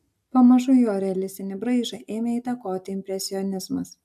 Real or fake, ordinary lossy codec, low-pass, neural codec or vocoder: real; AAC, 96 kbps; 14.4 kHz; none